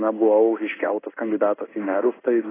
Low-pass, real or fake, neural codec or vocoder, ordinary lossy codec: 3.6 kHz; fake; codec, 16 kHz in and 24 kHz out, 1 kbps, XY-Tokenizer; AAC, 16 kbps